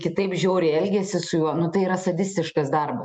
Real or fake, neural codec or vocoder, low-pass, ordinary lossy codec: real; none; 9.9 kHz; MP3, 96 kbps